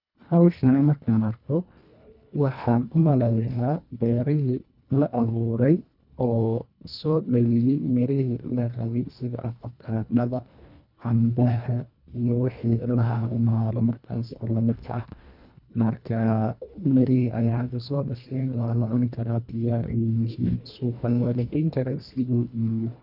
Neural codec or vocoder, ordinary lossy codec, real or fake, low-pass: codec, 24 kHz, 1.5 kbps, HILCodec; none; fake; 5.4 kHz